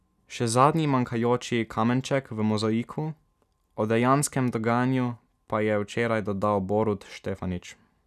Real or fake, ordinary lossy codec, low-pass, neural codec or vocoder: real; none; 14.4 kHz; none